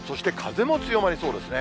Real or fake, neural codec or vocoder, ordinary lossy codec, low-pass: real; none; none; none